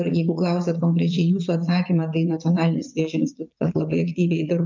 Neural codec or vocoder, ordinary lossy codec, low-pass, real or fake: vocoder, 22.05 kHz, 80 mel bands, Vocos; MP3, 64 kbps; 7.2 kHz; fake